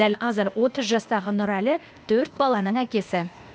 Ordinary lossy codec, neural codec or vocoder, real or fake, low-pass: none; codec, 16 kHz, 0.8 kbps, ZipCodec; fake; none